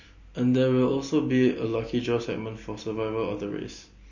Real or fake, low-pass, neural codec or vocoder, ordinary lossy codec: real; 7.2 kHz; none; MP3, 32 kbps